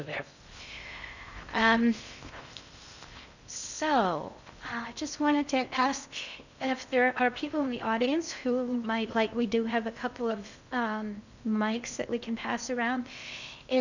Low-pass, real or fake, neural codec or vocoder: 7.2 kHz; fake; codec, 16 kHz in and 24 kHz out, 0.6 kbps, FocalCodec, streaming, 2048 codes